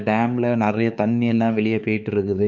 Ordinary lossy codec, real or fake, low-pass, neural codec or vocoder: none; fake; 7.2 kHz; codec, 16 kHz, 4 kbps, X-Codec, HuBERT features, trained on LibriSpeech